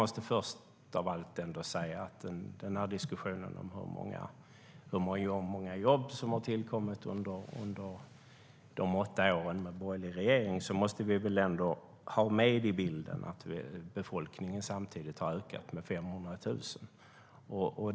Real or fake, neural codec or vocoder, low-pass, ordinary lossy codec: real; none; none; none